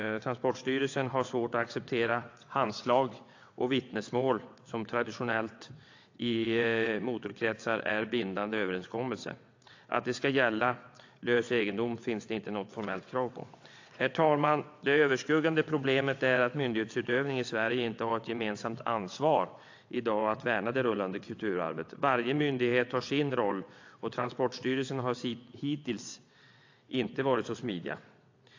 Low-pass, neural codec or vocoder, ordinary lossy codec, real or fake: 7.2 kHz; vocoder, 22.05 kHz, 80 mel bands, WaveNeXt; AAC, 48 kbps; fake